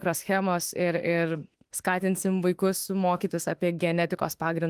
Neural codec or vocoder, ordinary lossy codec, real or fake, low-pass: autoencoder, 48 kHz, 32 numbers a frame, DAC-VAE, trained on Japanese speech; Opus, 24 kbps; fake; 14.4 kHz